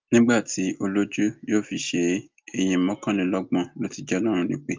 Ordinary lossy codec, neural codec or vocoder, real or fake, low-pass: Opus, 24 kbps; none; real; 7.2 kHz